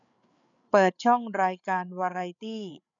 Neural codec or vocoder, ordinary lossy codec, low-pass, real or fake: codec, 16 kHz, 8 kbps, FreqCodec, larger model; none; 7.2 kHz; fake